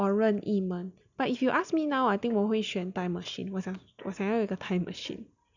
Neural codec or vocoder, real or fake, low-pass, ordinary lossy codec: none; real; 7.2 kHz; none